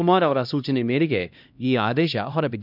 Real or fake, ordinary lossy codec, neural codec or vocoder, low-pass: fake; none; codec, 16 kHz, 1 kbps, X-Codec, HuBERT features, trained on LibriSpeech; 5.4 kHz